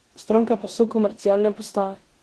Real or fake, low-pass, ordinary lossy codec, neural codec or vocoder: fake; 10.8 kHz; Opus, 16 kbps; codec, 16 kHz in and 24 kHz out, 0.9 kbps, LongCat-Audio-Codec, four codebook decoder